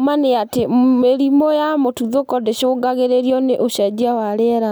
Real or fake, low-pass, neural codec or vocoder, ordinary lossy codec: real; none; none; none